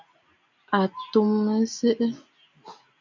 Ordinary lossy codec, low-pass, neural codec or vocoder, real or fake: MP3, 64 kbps; 7.2 kHz; none; real